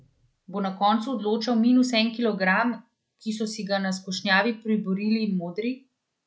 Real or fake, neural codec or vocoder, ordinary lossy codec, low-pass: real; none; none; none